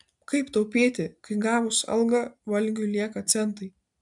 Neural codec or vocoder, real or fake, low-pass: none; real; 10.8 kHz